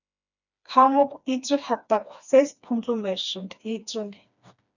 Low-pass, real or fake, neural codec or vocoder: 7.2 kHz; fake; codec, 16 kHz, 2 kbps, FreqCodec, smaller model